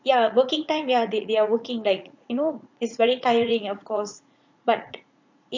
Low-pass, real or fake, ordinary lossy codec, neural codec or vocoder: 7.2 kHz; fake; MP3, 48 kbps; vocoder, 44.1 kHz, 80 mel bands, Vocos